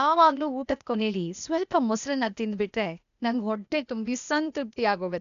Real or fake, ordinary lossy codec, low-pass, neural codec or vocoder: fake; none; 7.2 kHz; codec, 16 kHz, 0.8 kbps, ZipCodec